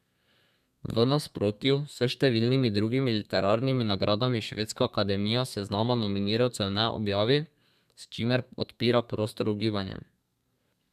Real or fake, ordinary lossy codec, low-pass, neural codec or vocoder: fake; none; 14.4 kHz; codec, 32 kHz, 1.9 kbps, SNAC